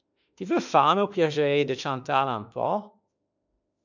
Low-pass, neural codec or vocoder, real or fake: 7.2 kHz; autoencoder, 48 kHz, 32 numbers a frame, DAC-VAE, trained on Japanese speech; fake